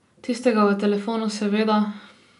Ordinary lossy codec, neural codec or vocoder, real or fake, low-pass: none; none; real; 10.8 kHz